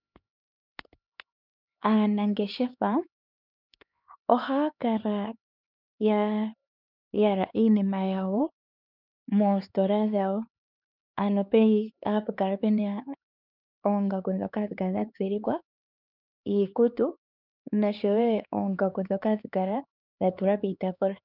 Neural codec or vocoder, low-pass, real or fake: codec, 16 kHz, 4 kbps, X-Codec, HuBERT features, trained on LibriSpeech; 5.4 kHz; fake